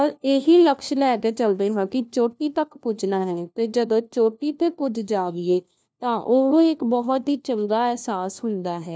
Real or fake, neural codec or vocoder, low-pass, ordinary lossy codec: fake; codec, 16 kHz, 1 kbps, FunCodec, trained on LibriTTS, 50 frames a second; none; none